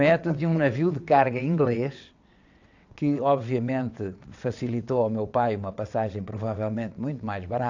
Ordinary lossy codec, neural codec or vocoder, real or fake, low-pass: AAC, 48 kbps; vocoder, 22.05 kHz, 80 mel bands, WaveNeXt; fake; 7.2 kHz